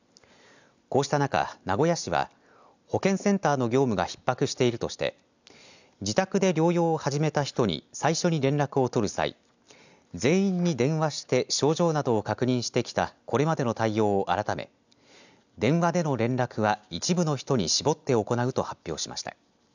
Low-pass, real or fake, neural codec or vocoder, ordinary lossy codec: 7.2 kHz; real; none; none